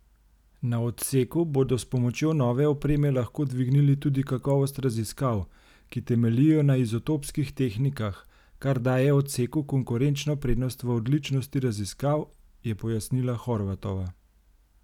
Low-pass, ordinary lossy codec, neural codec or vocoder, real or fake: 19.8 kHz; none; none; real